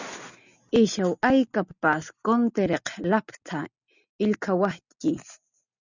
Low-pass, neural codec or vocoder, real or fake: 7.2 kHz; none; real